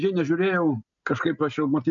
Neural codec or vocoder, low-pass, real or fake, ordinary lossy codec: none; 7.2 kHz; real; MP3, 64 kbps